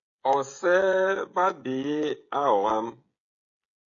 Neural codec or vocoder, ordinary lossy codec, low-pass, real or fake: codec, 16 kHz, 16 kbps, FreqCodec, smaller model; AAC, 48 kbps; 7.2 kHz; fake